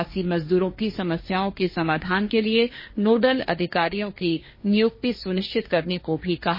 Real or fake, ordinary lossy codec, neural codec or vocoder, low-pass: fake; MP3, 24 kbps; codec, 16 kHz, 1.1 kbps, Voila-Tokenizer; 5.4 kHz